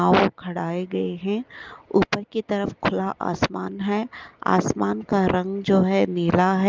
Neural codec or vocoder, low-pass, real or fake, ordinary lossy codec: none; 7.2 kHz; real; Opus, 32 kbps